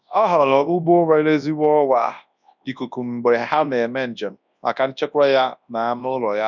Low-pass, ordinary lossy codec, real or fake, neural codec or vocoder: 7.2 kHz; none; fake; codec, 24 kHz, 0.9 kbps, WavTokenizer, large speech release